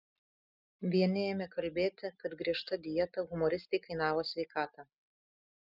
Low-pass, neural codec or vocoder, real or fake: 5.4 kHz; none; real